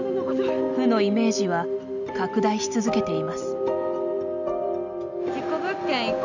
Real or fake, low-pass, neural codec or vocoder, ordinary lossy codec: real; 7.2 kHz; none; none